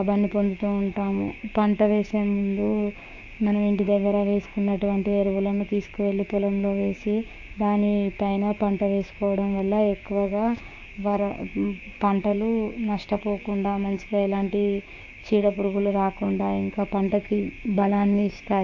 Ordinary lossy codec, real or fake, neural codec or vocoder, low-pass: AAC, 48 kbps; fake; codec, 16 kHz, 6 kbps, DAC; 7.2 kHz